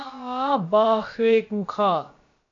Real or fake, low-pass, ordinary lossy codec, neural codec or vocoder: fake; 7.2 kHz; MP3, 48 kbps; codec, 16 kHz, about 1 kbps, DyCAST, with the encoder's durations